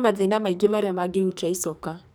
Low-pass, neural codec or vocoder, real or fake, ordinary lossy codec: none; codec, 44.1 kHz, 2.6 kbps, SNAC; fake; none